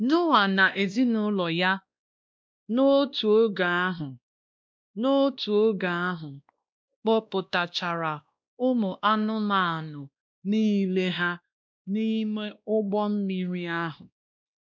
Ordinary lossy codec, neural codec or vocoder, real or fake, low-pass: none; codec, 16 kHz, 1 kbps, X-Codec, WavLM features, trained on Multilingual LibriSpeech; fake; none